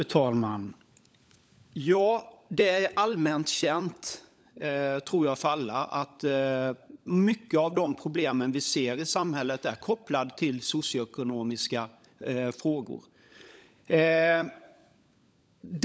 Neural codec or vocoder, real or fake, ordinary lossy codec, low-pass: codec, 16 kHz, 16 kbps, FunCodec, trained on LibriTTS, 50 frames a second; fake; none; none